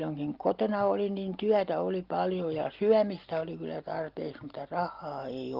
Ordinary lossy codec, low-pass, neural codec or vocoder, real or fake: Opus, 32 kbps; 5.4 kHz; none; real